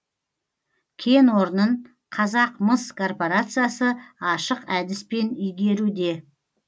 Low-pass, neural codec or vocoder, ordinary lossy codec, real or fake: none; none; none; real